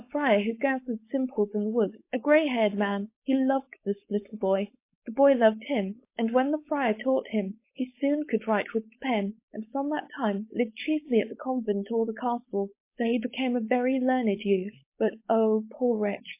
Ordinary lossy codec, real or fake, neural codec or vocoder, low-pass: MP3, 24 kbps; fake; codec, 16 kHz, 4.8 kbps, FACodec; 3.6 kHz